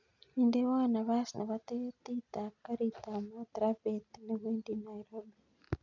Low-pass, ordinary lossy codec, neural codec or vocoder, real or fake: 7.2 kHz; none; none; real